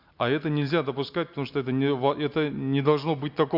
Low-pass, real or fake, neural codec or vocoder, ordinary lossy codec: 5.4 kHz; real; none; Opus, 64 kbps